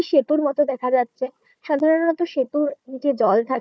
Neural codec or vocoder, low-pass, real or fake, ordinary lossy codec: codec, 16 kHz, 4 kbps, FunCodec, trained on Chinese and English, 50 frames a second; none; fake; none